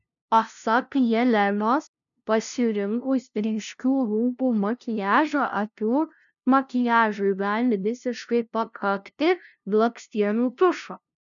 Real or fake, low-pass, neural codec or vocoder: fake; 7.2 kHz; codec, 16 kHz, 0.5 kbps, FunCodec, trained on LibriTTS, 25 frames a second